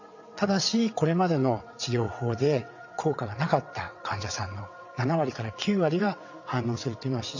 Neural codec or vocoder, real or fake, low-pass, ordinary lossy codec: vocoder, 22.05 kHz, 80 mel bands, WaveNeXt; fake; 7.2 kHz; none